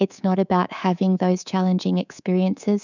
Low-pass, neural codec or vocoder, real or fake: 7.2 kHz; codec, 24 kHz, 3.1 kbps, DualCodec; fake